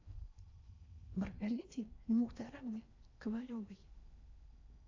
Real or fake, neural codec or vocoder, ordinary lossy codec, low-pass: fake; codec, 16 kHz in and 24 kHz out, 0.6 kbps, FocalCodec, streaming, 4096 codes; AAC, 48 kbps; 7.2 kHz